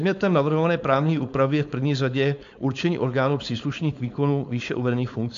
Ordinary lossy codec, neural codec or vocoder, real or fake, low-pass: AAC, 64 kbps; codec, 16 kHz, 4.8 kbps, FACodec; fake; 7.2 kHz